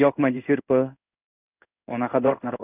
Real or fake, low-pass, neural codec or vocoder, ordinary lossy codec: fake; 3.6 kHz; codec, 16 kHz in and 24 kHz out, 1 kbps, XY-Tokenizer; none